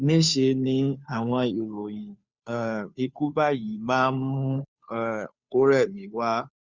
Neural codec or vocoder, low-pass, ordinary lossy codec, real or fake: codec, 16 kHz, 2 kbps, FunCodec, trained on Chinese and English, 25 frames a second; 7.2 kHz; Opus, 64 kbps; fake